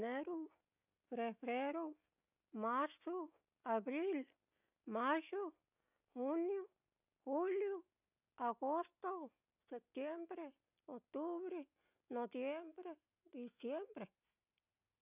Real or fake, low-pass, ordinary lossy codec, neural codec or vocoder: real; 3.6 kHz; none; none